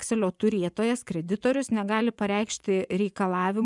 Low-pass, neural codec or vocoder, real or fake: 10.8 kHz; vocoder, 24 kHz, 100 mel bands, Vocos; fake